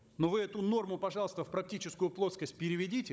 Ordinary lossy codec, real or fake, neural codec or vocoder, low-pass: none; fake; codec, 16 kHz, 16 kbps, FunCodec, trained on Chinese and English, 50 frames a second; none